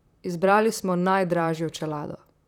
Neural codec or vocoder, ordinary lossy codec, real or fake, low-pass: vocoder, 44.1 kHz, 128 mel bands, Pupu-Vocoder; none; fake; 19.8 kHz